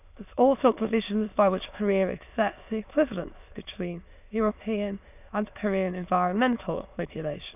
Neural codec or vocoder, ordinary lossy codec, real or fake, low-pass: autoencoder, 22.05 kHz, a latent of 192 numbers a frame, VITS, trained on many speakers; none; fake; 3.6 kHz